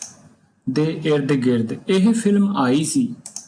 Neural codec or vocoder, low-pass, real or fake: none; 9.9 kHz; real